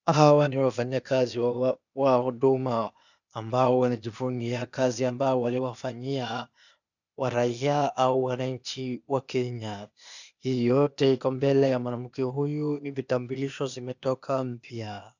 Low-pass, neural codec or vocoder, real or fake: 7.2 kHz; codec, 16 kHz, 0.8 kbps, ZipCodec; fake